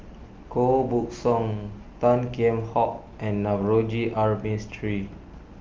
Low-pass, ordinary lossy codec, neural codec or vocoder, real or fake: 7.2 kHz; Opus, 24 kbps; none; real